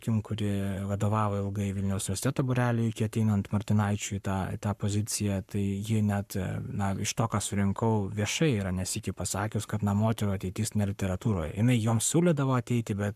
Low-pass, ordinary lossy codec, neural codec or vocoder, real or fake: 14.4 kHz; MP3, 96 kbps; codec, 44.1 kHz, 7.8 kbps, Pupu-Codec; fake